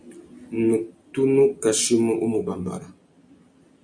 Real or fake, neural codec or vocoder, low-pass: real; none; 9.9 kHz